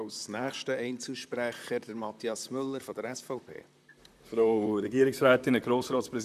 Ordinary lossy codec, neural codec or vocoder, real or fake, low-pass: none; vocoder, 44.1 kHz, 128 mel bands, Pupu-Vocoder; fake; 14.4 kHz